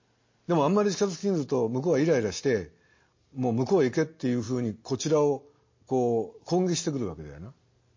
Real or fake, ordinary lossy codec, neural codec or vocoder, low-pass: real; MP3, 32 kbps; none; 7.2 kHz